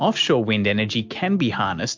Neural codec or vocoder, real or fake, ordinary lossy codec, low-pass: none; real; MP3, 64 kbps; 7.2 kHz